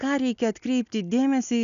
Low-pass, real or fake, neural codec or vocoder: 7.2 kHz; real; none